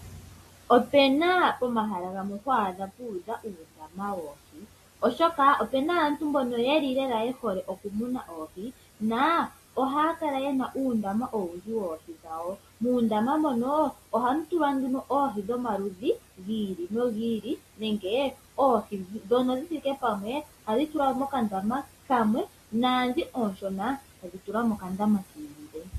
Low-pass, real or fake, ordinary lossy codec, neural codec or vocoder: 14.4 kHz; real; AAC, 48 kbps; none